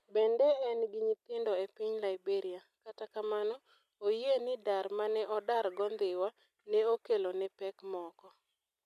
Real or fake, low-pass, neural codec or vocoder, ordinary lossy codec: real; 14.4 kHz; none; none